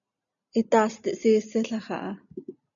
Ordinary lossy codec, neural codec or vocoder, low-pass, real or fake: MP3, 96 kbps; none; 7.2 kHz; real